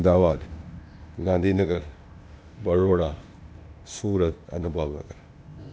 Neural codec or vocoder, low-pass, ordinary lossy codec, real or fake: codec, 16 kHz, 0.8 kbps, ZipCodec; none; none; fake